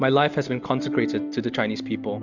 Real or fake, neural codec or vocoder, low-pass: real; none; 7.2 kHz